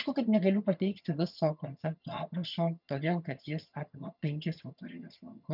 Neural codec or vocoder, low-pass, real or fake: vocoder, 22.05 kHz, 80 mel bands, HiFi-GAN; 5.4 kHz; fake